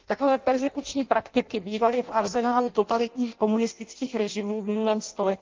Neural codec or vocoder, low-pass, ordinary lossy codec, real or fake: codec, 16 kHz in and 24 kHz out, 0.6 kbps, FireRedTTS-2 codec; 7.2 kHz; Opus, 32 kbps; fake